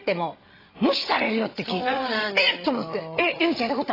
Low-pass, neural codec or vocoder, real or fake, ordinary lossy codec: 5.4 kHz; codec, 16 kHz, 8 kbps, FreqCodec, larger model; fake; AAC, 24 kbps